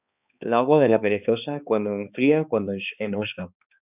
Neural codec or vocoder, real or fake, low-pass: codec, 16 kHz, 2 kbps, X-Codec, HuBERT features, trained on balanced general audio; fake; 3.6 kHz